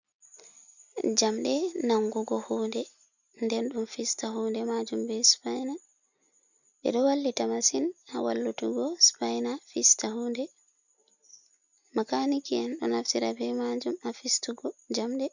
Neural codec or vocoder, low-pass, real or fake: none; 7.2 kHz; real